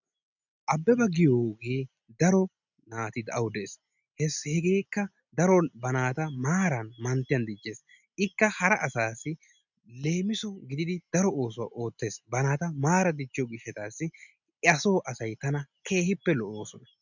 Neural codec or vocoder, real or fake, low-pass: none; real; 7.2 kHz